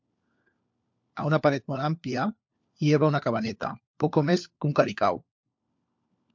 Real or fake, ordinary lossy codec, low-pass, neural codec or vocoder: fake; MP3, 64 kbps; 7.2 kHz; codec, 16 kHz, 4 kbps, FunCodec, trained on LibriTTS, 50 frames a second